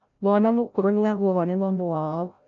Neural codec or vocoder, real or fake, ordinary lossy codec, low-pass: codec, 16 kHz, 0.5 kbps, FreqCodec, larger model; fake; none; 7.2 kHz